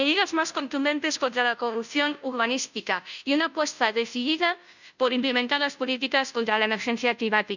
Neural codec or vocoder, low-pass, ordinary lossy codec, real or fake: codec, 16 kHz, 0.5 kbps, FunCodec, trained on Chinese and English, 25 frames a second; 7.2 kHz; none; fake